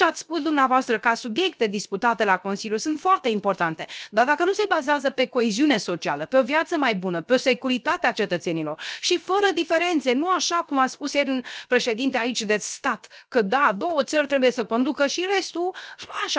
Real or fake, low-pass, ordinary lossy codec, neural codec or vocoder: fake; none; none; codec, 16 kHz, 0.7 kbps, FocalCodec